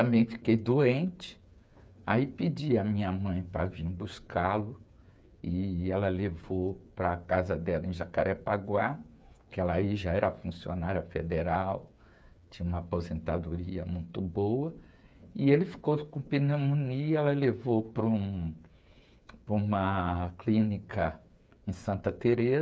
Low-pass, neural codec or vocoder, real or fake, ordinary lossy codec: none; codec, 16 kHz, 8 kbps, FreqCodec, smaller model; fake; none